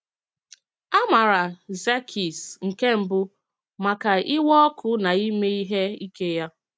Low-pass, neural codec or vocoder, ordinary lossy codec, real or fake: none; none; none; real